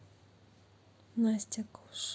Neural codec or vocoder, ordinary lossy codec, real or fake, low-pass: none; none; real; none